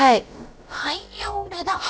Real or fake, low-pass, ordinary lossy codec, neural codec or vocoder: fake; none; none; codec, 16 kHz, about 1 kbps, DyCAST, with the encoder's durations